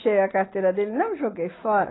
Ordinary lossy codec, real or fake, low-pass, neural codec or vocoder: AAC, 16 kbps; real; 7.2 kHz; none